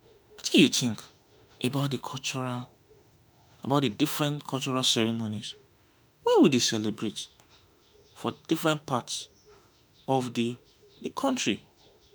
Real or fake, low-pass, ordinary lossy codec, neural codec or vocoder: fake; none; none; autoencoder, 48 kHz, 32 numbers a frame, DAC-VAE, trained on Japanese speech